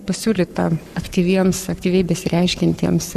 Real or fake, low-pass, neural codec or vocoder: fake; 14.4 kHz; codec, 44.1 kHz, 7.8 kbps, Pupu-Codec